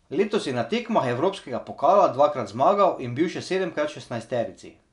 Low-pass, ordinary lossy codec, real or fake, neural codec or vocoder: 10.8 kHz; none; real; none